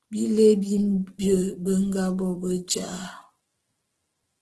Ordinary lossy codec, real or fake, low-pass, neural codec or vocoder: Opus, 16 kbps; fake; 10.8 kHz; autoencoder, 48 kHz, 128 numbers a frame, DAC-VAE, trained on Japanese speech